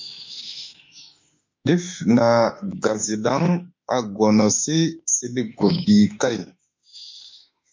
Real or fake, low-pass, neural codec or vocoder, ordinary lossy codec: fake; 7.2 kHz; autoencoder, 48 kHz, 32 numbers a frame, DAC-VAE, trained on Japanese speech; MP3, 48 kbps